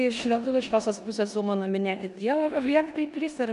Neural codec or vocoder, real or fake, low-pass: codec, 16 kHz in and 24 kHz out, 0.9 kbps, LongCat-Audio-Codec, four codebook decoder; fake; 10.8 kHz